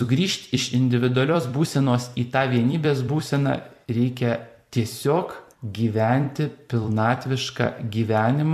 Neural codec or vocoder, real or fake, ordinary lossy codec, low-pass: vocoder, 44.1 kHz, 128 mel bands every 256 samples, BigVGAN v2; fake; AAC, 96 kbps; 14.4 kHz